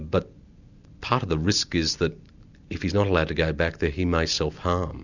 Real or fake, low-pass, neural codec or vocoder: real; 7.2 kHz; none